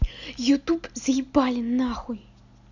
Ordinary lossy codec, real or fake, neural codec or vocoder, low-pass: none; real; none; 7.2 kHz